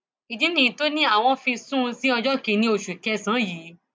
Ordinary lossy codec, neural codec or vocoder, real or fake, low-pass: none; none; real; none